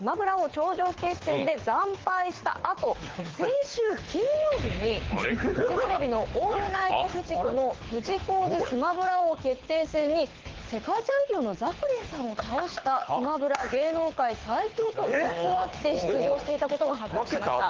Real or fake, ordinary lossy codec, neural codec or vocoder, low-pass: fake; Opus, 16 kbps; codec, 24 kHz, 6 kbps, HILCodec; 7.2 kHz